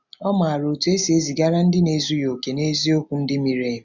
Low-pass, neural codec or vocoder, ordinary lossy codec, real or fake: 7.2 kHz; none; none; real